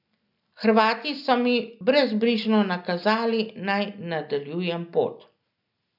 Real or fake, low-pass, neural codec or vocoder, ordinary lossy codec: real; 5.4 kHz; none; none